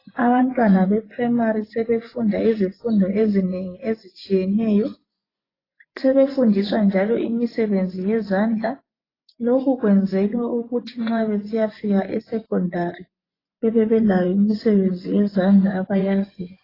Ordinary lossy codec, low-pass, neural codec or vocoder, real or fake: AAC, 24 kbps; 5.4 kHz; vocoder, 24 kHz, 100 mel bands, Vocos; fake